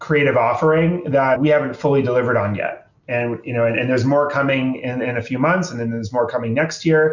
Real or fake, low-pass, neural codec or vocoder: real; 7.2 kHz; none